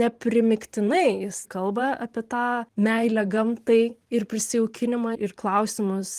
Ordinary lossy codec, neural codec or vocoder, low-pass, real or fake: Opus, 24 kbps; vocoder, 44.1 kHz, 128 mel bands every 512 samples, BigVGAN v2; 14.4 kHz; fake